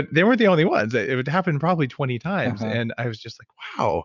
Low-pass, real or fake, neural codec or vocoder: 7.2 kHz; real; none